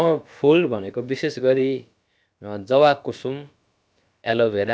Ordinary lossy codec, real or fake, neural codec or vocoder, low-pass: none; fake; codec, 16 kHz, about 1 kbps, DyCAST, with the encoder's durations; none